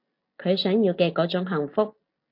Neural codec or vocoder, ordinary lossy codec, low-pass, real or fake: none; MP3, 32 kbps; 5.4 kHz; real